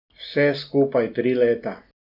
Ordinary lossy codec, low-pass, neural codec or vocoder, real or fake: none; 5.4 kHz; none; real